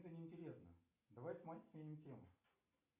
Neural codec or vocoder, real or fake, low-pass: none; real; 3.6 kHz